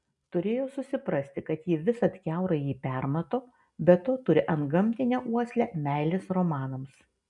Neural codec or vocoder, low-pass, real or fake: none; 10.8 kHz; real